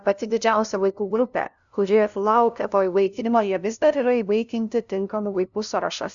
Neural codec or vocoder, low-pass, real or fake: codec, 16 kHz, 0.5 kbps, FunCodec, trained on LibriTTS, 25 frames a second; 7.2 kHz; fake